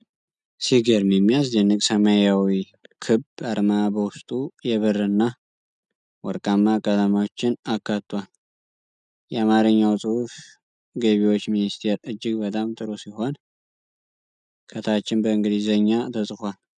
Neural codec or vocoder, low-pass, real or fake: none; 9.9 kHz; real